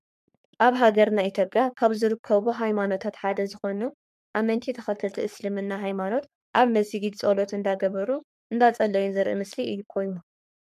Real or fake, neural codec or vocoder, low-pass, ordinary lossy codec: fake; codec, 44.1 kHz, 3.4 kbps, Pupu-Codec; 14.4 kHz; MP3, 96 kbps